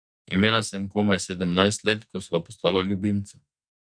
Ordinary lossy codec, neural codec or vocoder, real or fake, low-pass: none; codec, 44.1 kHz, 2.6 kbps, SNAC; fake; 9.9 kHz